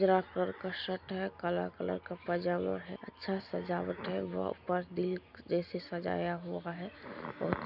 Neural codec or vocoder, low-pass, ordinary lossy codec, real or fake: none; 5.4 kHz; none; real